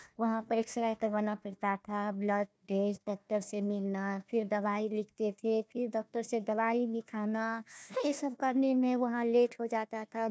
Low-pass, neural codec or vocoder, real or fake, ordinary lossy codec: none; codec, 16 kHz, 1 kbps, FunCodec, trained on Chinese and English, 50 frames a second; fake; none